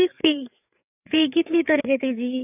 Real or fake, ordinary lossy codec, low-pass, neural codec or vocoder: fake; none; 3.6 kHz; codec, 16 kHz, 4 kbps, FreqCodec, larger model